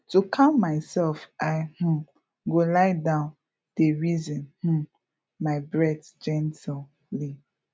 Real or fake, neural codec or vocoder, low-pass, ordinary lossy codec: real; none; none; none